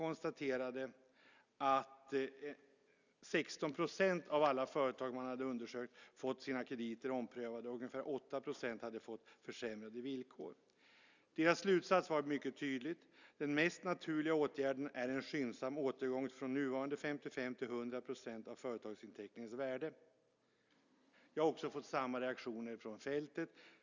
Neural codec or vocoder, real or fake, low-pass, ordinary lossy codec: none; real; 7.2 kHz; none